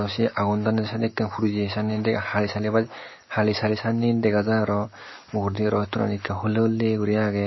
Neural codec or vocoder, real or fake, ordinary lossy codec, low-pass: none; real; MP3, 24 kbps; 7.2 kHz